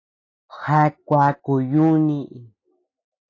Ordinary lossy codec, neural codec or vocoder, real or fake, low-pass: AAC, 32 kbps; none; real; 7.2 kHz